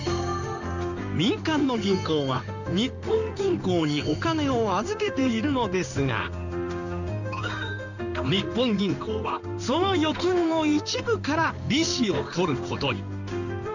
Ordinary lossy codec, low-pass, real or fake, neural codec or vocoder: none; 7.2 kHz; fake; codec, 16 kHz in and 24 kHz out, 1 kbps, XY-Tokenizer